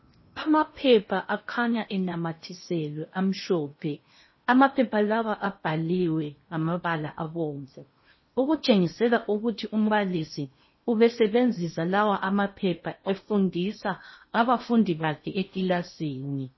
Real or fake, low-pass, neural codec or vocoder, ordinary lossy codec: fake; 7.2 kHz; codec, 16 kHz in and 24 kHz out, 0.8 kbps, FocalCodec, streaming, 65536 codes; MP3, 24 kbps